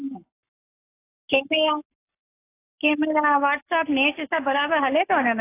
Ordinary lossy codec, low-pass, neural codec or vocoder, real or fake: AAC, 24 kbps; 3.6 kHz; codec, 44.1 kHz, 7.8 kbps, Pupu-Codec; fake